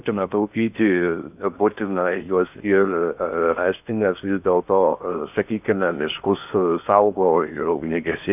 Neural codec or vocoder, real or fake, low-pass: codec, 16 kHz in and 24 kHz out, 0.6 kbps, FocalCodec, streaming, 2048 codes; fake; 3.6 kHz